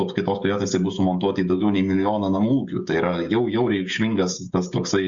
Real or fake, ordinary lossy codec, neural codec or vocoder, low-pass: fake; Opus, 64 kbps; codec, 16 kHz, 16 kbps, FreqCodec, smaller model; 7.2 kHz